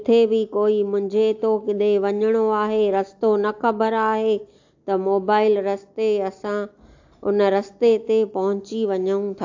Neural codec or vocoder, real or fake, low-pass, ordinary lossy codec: none; real; 7.2 kHz; AAC, 48 kbps